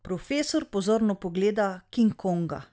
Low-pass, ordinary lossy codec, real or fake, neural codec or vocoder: none; none; real; none